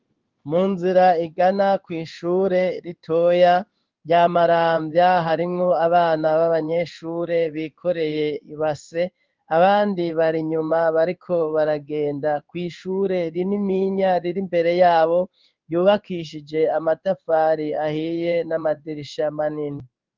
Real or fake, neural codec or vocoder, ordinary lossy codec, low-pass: fake; codec, 16 kHz in and 24 kHz out, 1 kbps, XY-Tokenizer; Opus, 24 kbps; 7.2 kHz